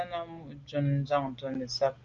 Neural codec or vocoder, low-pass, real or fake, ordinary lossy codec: none; 7.2 kHz; real; Opus, 32 kbps